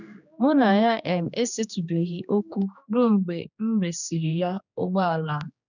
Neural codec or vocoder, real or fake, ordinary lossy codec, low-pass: codec, 16 kHz, 2 kbps, X-Codec, HuBERT features, trained on general audio; fake; none; 7.2 kHz